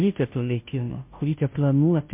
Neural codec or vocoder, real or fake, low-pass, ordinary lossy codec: codec, 16 kHz, 0.5 kbps, FunCodec, trained on Chinese and English, 25 frames a second; fake; 3.6 kHz; MP3, 32 kbps